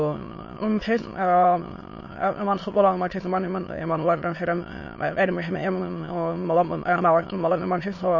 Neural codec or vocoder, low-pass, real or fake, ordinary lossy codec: autoencoder, 22.05 kHz, a latent of 192 numbers a frame, VITS, trained on many speakers; 7.2 kHz; fake; MP3, 32 kbps